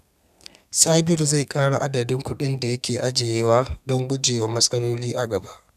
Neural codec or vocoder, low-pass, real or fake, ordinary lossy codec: codec, 32 kHz, 1.9 kbps, SNAC; 14.4 kHz; fake; none